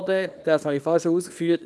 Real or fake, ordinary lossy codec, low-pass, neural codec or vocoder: fake; none; none; codec, 24 kHz, 0.9 kbps, WavTokenizer, small release